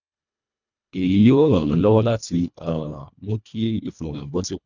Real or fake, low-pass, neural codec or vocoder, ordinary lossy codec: fake; 7.2 kHz; codec, 24 kHz, 1.5 kbps, HILCodec; none